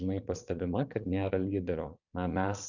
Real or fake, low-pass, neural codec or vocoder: fake; 7.2 kHz; vocoder, 22.05 kHz, 80 mel bands, Vocos